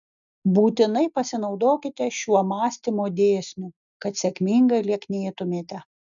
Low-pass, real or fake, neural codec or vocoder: 7.2 kHz; real; none